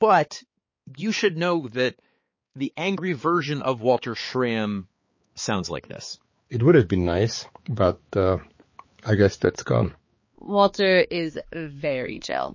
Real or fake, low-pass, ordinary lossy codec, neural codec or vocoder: fake; 7.2 kHz; MP3, 32 kbps; codec, 16 kHz, 4 kbps, X-Codec, HuBERT features, trained on balanced general audio